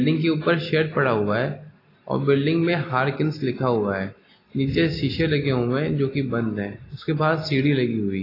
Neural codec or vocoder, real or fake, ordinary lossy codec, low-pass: none; real; AAC, 24 kbps; 5.4 kHz